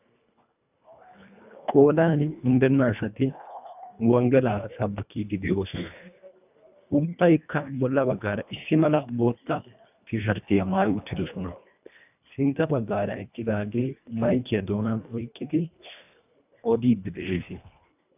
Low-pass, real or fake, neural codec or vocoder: 3.6 kHz; fake; codec, 24 kHz, 1.5 kbps, HILCodec